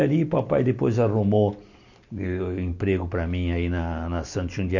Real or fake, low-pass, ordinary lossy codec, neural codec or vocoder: real; 7.2 kHz; none; none